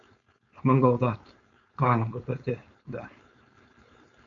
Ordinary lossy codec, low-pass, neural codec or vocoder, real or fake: MP3, 64 kbps; 7.2 kHz; codec, 16 kHz, 4.8 kbps, FACodec; fake